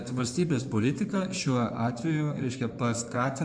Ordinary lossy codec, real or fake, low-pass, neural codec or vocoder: MP3, 96 kbps; fake; 9.9 kHz; codec, 16 kHz in and 24 kHz out, 2.2 kbps, FireRedTTS-2 codec